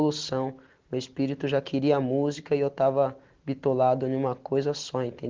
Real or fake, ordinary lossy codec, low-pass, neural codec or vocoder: real; Opus, 16 kbps; 7.2 kHz; none